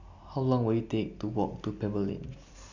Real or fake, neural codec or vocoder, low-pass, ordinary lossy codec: real; none; 7.2 kHz; none